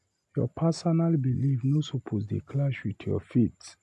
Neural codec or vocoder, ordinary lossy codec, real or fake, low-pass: none; none; real; none